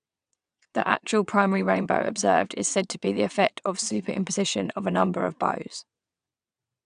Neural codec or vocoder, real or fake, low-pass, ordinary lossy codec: vocoder, 22.05 kHz, 80 mel bands, WaveNeXt; fake; 9.9 kHz; none